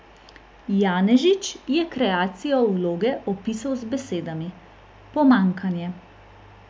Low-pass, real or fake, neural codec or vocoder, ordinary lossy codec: none; real; none; none